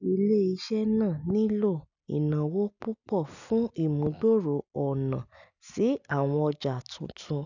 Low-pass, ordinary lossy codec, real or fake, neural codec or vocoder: 7.2 kHz; none; real; none